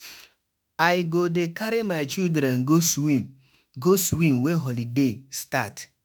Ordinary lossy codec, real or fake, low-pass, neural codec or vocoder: none; fake; none; autoencoder, 48 kHz, 32 numbers a frame, DAC-VAE, trained on Japanese speech